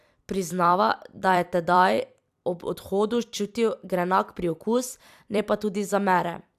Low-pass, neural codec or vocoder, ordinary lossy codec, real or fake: 14.4 kHz; vocoder, 44.1 kHz, 128 mel bands every 256 samples, BigVGAN v2; AAC, 96 kbps; fake